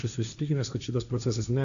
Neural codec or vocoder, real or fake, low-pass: codec, 16 kHz, 1.1 kbps, Voila-Tokenizer; fake; 7.2 kHz